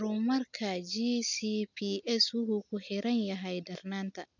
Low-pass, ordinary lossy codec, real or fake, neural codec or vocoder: 7.2 kHz; none; real; none